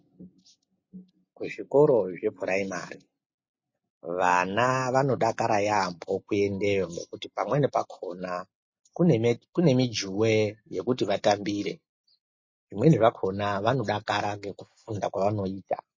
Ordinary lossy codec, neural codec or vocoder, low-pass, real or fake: MP3, 32 kbps; none; 7.2 kHz; real